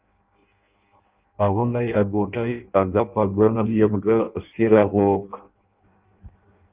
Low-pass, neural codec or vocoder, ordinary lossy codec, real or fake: 3.6 kHz; codec, 16 kHz in and 24 kHz out, 0.6 kbps, FireRedTTS-2 codec; Opus, 32 kbps; fake